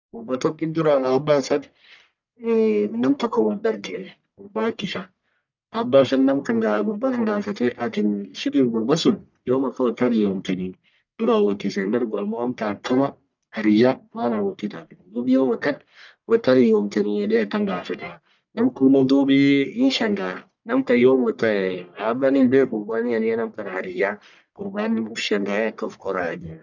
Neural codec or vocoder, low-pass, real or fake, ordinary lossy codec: codec, 44.1 kHz, 1.7 kbps, Pupu-Codec; 7.2 kHz; fake; none